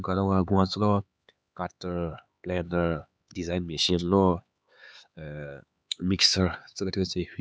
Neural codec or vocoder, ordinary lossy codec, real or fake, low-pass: codec, 16 kHz, 4 kbps, X-Codec, HuBERT features, trained on LibriSpeech; none; fake; none